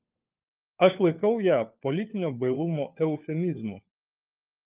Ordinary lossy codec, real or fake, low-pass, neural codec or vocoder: Opus, 24 kbps; fake; 3.6 kHz; codec, 16 kHz, 4 kbps, FunCodec, trained on LibriTTS, 50 frames a second